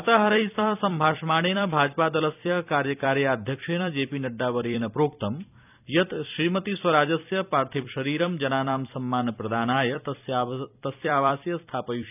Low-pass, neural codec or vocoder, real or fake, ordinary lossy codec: 3.6 kHz; vocoder, 44.1 kHz, 128 mel bands every 256 samples, BigVGAN v2; fake; none